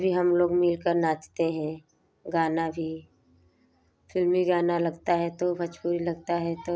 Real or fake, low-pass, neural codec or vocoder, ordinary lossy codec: real; none; none; none